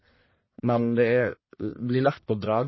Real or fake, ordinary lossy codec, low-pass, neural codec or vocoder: fake; MP3, 24 kbps; 7.2 kHz; codec, 44.1 kHz, 1.7 kbps, Pupu-Codec